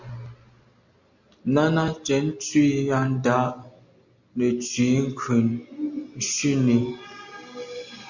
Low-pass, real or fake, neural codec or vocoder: 7.2 kHz; real; none